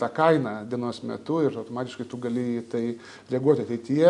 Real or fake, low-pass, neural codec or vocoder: real; 10.8 kHz; none